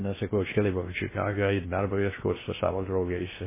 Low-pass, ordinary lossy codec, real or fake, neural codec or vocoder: 3.6 kHz; MP3, 16 kbps; fake; codec, 16 kHz in and 24 kHz out, 0.6 kbps, FocalCodec, streaming, 2048 codes